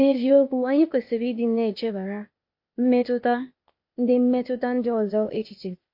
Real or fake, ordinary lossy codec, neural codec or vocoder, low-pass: fake; MP3, 32 kbps; codec, 16 kHz, 0.8 kbps, ZipCodec; 5.4 kHz